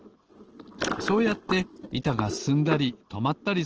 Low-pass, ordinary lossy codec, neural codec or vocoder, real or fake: 7.2 kHz; Opus, 16 kbps; none; real